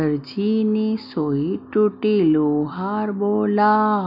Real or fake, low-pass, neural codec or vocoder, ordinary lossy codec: real; 5.4 kHz; none; none